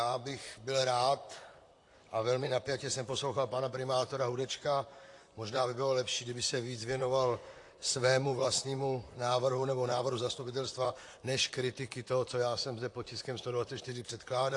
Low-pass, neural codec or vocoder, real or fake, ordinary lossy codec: 10.8 kHz; vocoder, 44.1 kHz, 128 mel bands, Pupu-Vocoder; fake; AAC, 48 kbps